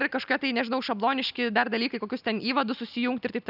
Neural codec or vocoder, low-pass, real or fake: none; 5.4 kHz; real